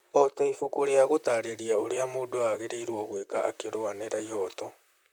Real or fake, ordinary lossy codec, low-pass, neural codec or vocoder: fake; none; none; vocoder, 44.1 kHz, 128 mel bands, Pupu-Vocoder